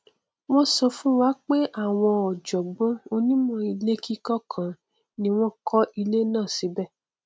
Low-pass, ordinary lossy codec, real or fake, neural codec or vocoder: none; none; real; none